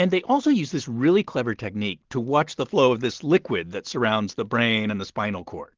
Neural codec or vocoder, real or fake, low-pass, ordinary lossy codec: none; real; 7.2 kHz; Opus, 16 kbps